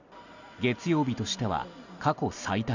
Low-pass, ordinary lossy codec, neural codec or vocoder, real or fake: 7.2 kHz; none; none; real